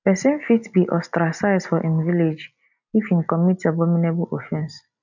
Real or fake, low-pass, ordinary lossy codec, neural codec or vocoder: real; 7.2 kHz; none; none